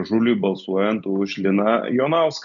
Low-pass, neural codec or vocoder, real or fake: 7.2 kHz; none; real